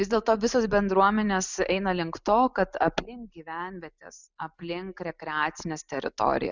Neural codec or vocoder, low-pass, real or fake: none; 7.2 kHz; real